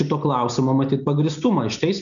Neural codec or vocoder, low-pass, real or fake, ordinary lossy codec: none; 7.2 kHz; real; MP3, 96 kbps